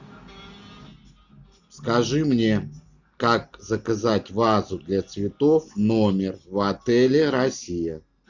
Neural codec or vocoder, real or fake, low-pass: none; real; 7.2 kHz